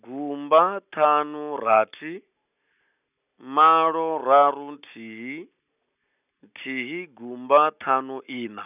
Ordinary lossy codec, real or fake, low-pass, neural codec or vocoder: none; real; 3.6 kHz; none